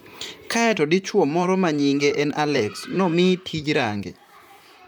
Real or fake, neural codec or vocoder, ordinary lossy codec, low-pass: fake; vocoder, 44.1 kHz, 128 mel bands, Pupu-Vocoder; none; none